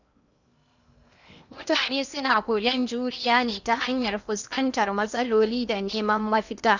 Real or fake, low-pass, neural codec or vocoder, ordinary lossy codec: fake; 7.2 kHz; codec, 16 kHz in and 24 kHz out, 0.8 kbps, FocalCodec, streaming, 65536 codes; none